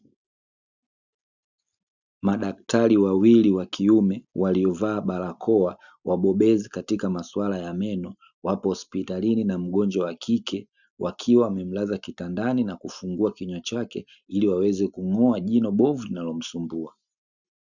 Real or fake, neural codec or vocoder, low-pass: real; none; 7.2 kHz